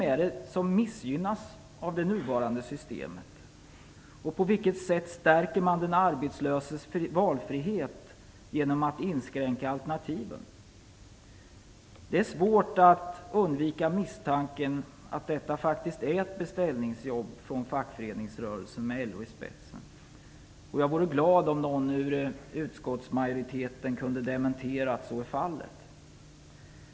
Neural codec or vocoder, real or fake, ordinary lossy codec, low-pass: none; real; none; none